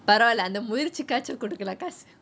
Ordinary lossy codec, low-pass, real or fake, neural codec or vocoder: none; none; real; none